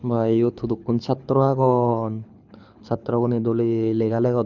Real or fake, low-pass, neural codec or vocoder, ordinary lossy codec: fake; 7.2 kHz; codec, 24 kHz, 6 kbps, HILCodec; none